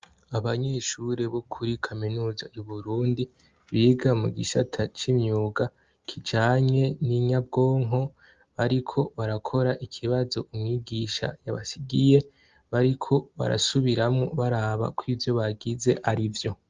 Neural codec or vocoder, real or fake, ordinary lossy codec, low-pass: none; real; Opus, 32 kbps; 7.2 kHz